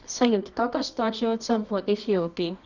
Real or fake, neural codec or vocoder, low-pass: fake; codec, 24 kHz, 0.9 kbps, WavTokenizer, medium music audio release; 7.2 kHz